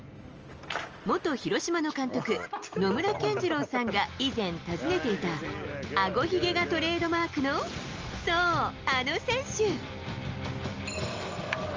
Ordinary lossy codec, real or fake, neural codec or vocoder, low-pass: Opus, 24 kbps; real; none; 7.2 kHz